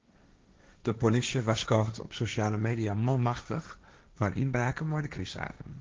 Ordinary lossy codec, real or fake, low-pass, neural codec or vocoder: Opus, 32 kbps; fake; 7.2 kHz; codec, 16 kHz, 1.1 kbps, Voila-Tokenizer